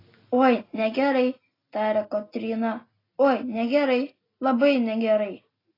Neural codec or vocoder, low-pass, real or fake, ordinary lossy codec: none; 5.4 kHz; real; AAC, 24 kbps